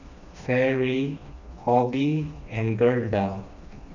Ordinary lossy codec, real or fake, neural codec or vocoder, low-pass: none; fake; codec, 16 kHz, 2 kbps, FreqCodec, smaller model; 7.2 kHz